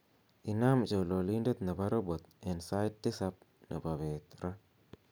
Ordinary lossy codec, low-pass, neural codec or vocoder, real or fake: none; none; none; real